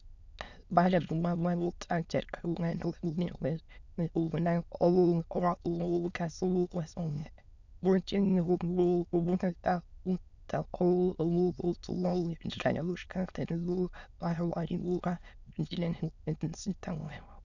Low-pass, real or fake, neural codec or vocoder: 7.2 kHz; fake; autoencoder, 22.05 kHz, a latent of 192 numbers a frame, VITS, trained on many speakers